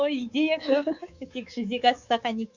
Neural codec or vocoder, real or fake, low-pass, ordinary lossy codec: codec, 24 kHz, 3.1 kbps, DualCodec; fake; 7.2 kHz; Opus, 64 kbps